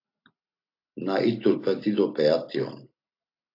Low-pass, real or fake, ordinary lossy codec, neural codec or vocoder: 5.4 kHz; real; AAC, 32 kbps; none